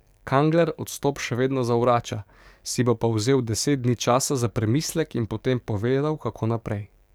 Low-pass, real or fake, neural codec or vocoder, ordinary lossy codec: none; fake; codec, 44.1 kHz, 7.8 kbps, DAC; none